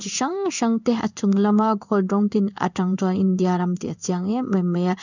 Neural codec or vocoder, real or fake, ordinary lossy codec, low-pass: codec, 16 kHz in and 24 kHz out, 1 kbps, XY-Tokenizer; fake; none; 7.2 kHz